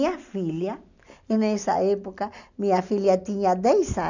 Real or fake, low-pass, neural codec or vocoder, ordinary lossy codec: real; 7.2 kHz; none; none